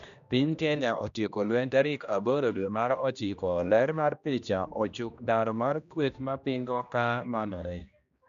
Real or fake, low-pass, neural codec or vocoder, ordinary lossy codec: fake; 7.2 kHz; codec, 16 kHz, 1 kbps, X-Codec, HuBERT features, trained on general audio; none